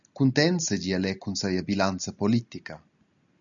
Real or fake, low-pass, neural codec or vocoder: real; 7.2 kHz; none